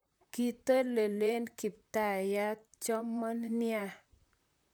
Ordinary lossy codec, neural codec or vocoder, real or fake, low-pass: none; vocoder, 44.1 kHz, 128 mel bands, Pupu-Vocoder; fake; none